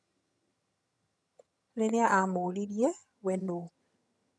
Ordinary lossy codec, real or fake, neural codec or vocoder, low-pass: none; fake; vocoder, 22.05 kHz, 80 mel bands, HiFi-GAN; none